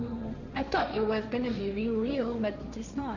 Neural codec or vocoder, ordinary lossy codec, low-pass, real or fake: codec, 16 kHz, 1.1 kbps, Voila-Tokenizer; none; 7.2 kHz; fake